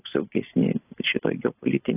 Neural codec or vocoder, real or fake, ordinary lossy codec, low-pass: none; real; AAC, 16 kbps; 3.6 kHz